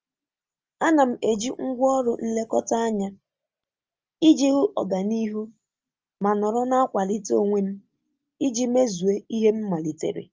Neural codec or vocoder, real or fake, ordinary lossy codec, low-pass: none; real; Opus, 24 kbps; 7.2 kHz